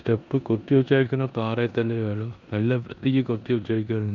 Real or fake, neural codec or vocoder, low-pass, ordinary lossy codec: fake; codec, 16 kHz in and 24 kHz out, 0.9 kbps, LongCat-Audio-Codec, four codebook decoder; 7.2 kHz; none